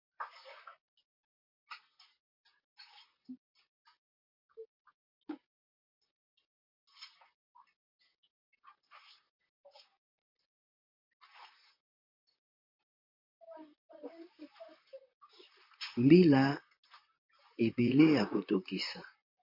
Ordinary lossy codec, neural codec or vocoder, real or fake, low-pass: MP3, 32 kbps; vocoder, 44.1 kHz, 128 mel bands, Pupu-Vocoder; fake; 5.4 kHz